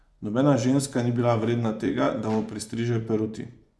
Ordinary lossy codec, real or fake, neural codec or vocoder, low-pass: none; fake; vocoder, 24 kHz, 100 mel bands, Vocos; none